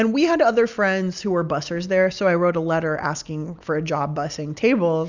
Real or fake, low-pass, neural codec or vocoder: real; 7.2 kHz; none